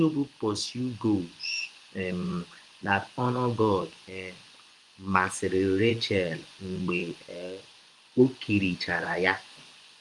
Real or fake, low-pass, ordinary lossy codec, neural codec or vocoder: fake; 10.8 kHz; Opus, 32 kbps; vocoder, 24 kHz, 100 mel bands, Vocos